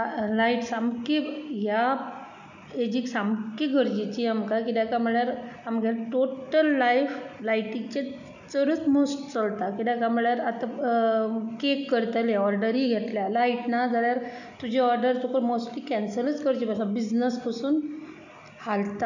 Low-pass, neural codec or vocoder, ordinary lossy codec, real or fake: 7.2 kHz; none; none; real